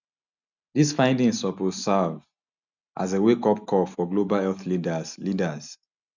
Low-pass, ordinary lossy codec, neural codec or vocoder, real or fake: 7.2 kHz; none; none; real